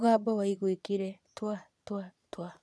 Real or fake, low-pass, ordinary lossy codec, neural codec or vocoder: fake; none; none; vocoder, 22.05 kHz, 80 mel bands, Vocos